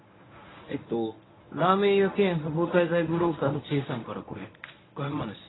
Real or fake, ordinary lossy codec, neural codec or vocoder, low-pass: fake; AAC, 16 kbps; codec, 24 kHz, 0.9 kbps, WavTokenizer, medium speech release version 1; 7.2 kHz